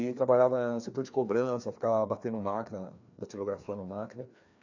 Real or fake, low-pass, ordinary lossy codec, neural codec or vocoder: fake; 7.2 kHz; none; codec, 16 kHz, 2 kbps, FreqCodec, larger model